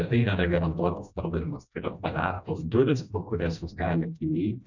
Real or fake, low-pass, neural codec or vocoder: fake; 7.2 kHz; codec, 16 kHz, 1 kbps, FreqCodec, smaller model